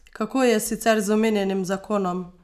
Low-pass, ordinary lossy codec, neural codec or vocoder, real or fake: 14.4 kHz; none; none; real